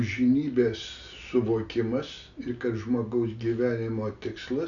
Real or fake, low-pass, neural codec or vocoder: real; 7.2 kHz; none